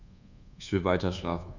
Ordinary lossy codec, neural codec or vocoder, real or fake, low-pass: none; codec, 24 kHz, 1.2 kbps, DualCodec; fake; 7.2 kHz